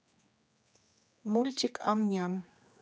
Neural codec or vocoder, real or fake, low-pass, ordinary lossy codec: codec, 16 kHz, 2 kbps, X-Codec, HuBERT features, trained on general audio; fake; none; none